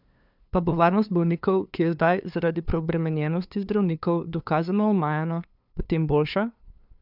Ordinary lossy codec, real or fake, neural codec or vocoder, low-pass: none; fake; codec, 16 kHz, 2 kbps, FunCodec, trained on LibriTTS, 25 frames a second; 5.4 kHz